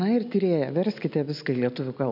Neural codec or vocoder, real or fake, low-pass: none; real; 5.4 kHz